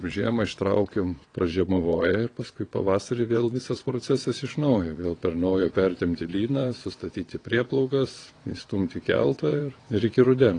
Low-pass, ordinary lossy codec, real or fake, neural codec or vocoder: 9.9 kHz; AAC, 32 kbps; fake; vocoder, 22.05 kHz, 80 mel bands, WaveNeXt